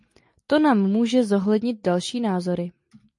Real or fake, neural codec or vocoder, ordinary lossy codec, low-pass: real; none; MP3, 48 kbps; 10.8 kHz